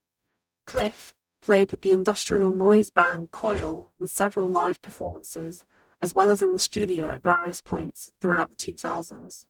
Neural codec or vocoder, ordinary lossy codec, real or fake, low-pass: codec, 44.1 kHz, 0.9 kbps, DAC; none; fake; 19.8 kHz